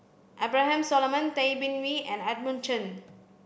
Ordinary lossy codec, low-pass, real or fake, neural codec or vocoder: none; none; real; none